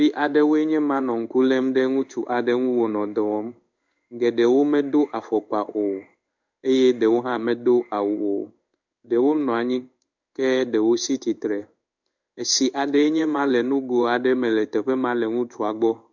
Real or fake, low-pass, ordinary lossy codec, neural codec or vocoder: fake; 7.2 kHz; MP3, 64 kbps; codec, 16 kHz in and 24 kHz out, 1 kbps, XY-Tokenizer